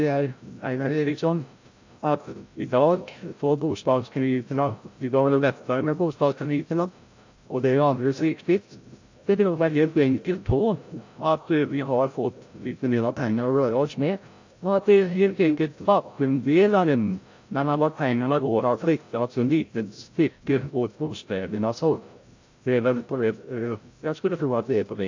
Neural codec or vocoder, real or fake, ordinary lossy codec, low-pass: codec, 16 kHz, 0.5 kbps, FreqCodec, larger model; fake; AAC, 48 kbps; 7.2 kHz